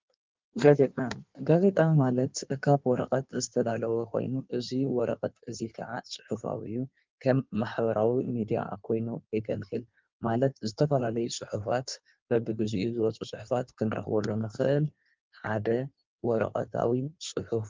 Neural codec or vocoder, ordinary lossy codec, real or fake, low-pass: codec, 16 kHz in and 24 kHz out, 1.1 kbps, FireRedTTS-2 codec; Opus, 24 kbps; fake; 7.2 kHz